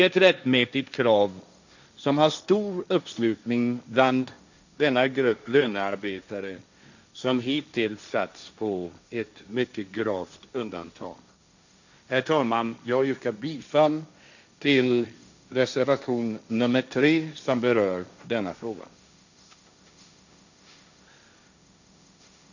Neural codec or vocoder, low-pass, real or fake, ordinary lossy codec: codec, 16 kHz, 1.1 kbps, Voila-Tokenizer; 7.2 kHz; fake; none